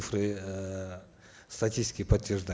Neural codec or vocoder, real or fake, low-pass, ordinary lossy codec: none; real; none; none